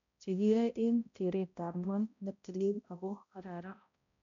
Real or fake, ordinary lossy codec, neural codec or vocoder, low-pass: fake; MP3, 64 kbps; codec, 16 kHz, 0.5 kbps, X-Codec, HuBERT features, trained on balanced general audio; 7.2 kHz